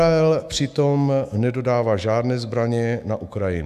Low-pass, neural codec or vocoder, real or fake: 14.4 kHz; autoencoder, 48 kHz, 128 numbers a frame, DAC-VAE, trained on Japanese speech; fake